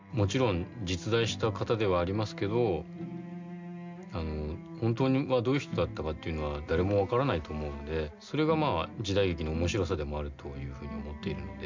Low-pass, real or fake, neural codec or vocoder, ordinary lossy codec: 7.2 kHz; real; none; MP3, 64 kbps